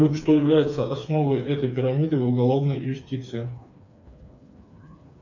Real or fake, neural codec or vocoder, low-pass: fake; codec, 16 kHz, 4 kbps, FreqCodec, smaller model; 7.2 kHz